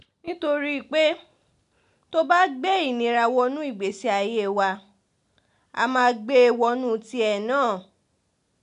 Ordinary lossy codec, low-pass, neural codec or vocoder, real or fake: none; 10.8 kHz; none; real